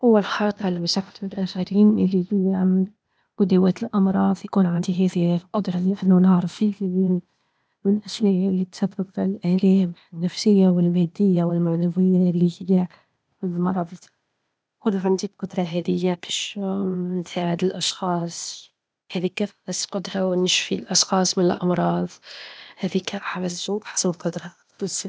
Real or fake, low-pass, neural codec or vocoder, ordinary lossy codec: fake; none; codec, 16 kHz, 0.8 kbps, ZipCodec; none